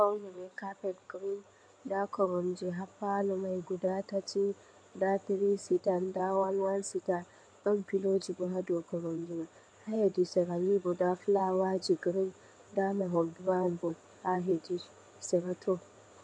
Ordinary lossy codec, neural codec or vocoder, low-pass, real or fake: MP3, 64 kbps; codec, 16 kHz in and 24 kHz out, 2.2 kbps, FireRedTTS-2 codec; 9.9 kHz; fake